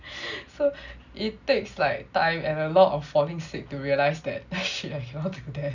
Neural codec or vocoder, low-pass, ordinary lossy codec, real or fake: none; 7.2 kHz; none; real